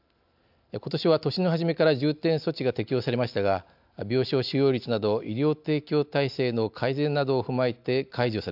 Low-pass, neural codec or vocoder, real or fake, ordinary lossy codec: 5.4 kHz; none; real; AAC, 48 kbps